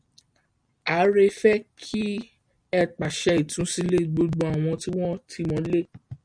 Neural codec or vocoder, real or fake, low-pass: none; real; 9.9 kHz